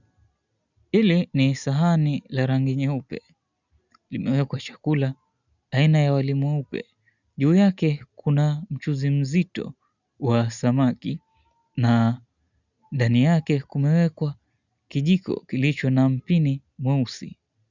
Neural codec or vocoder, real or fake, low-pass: none; real; 7.2 kHz